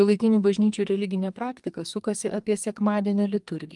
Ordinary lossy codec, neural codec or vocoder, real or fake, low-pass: Opus, 32 kbps; codec, 44.1 kHz, 2.6 kbps, SNAC; fake; 10.8 kHz